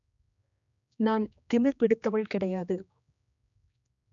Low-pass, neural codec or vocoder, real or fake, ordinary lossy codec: 7.2 kHz; codec, 16 kHz, 2 kbps, X-Codec, HuBERT features, trained on general audio; fake; none